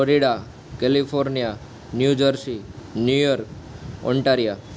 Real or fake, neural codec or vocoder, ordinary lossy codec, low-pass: real; none; none; none